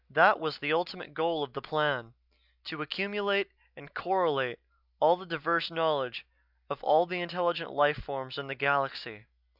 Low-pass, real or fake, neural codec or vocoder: 5.4 kHz; real; none